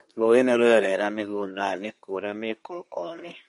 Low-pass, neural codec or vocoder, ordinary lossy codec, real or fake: 10.8 kHz; codec, 24 kHz, 1 kbps, SNAC; MP3, 48 kbps; fake